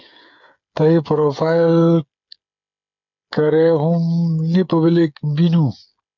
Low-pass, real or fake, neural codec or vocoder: 7.2 kHz; fake; codec, 16 kHz, 8 kbps, FreqCodec, smaller model